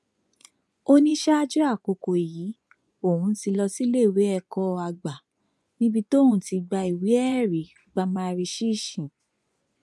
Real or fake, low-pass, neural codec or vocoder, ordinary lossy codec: fake; none; vocoder, 24 kHz, 100 mel bands, Vocos; none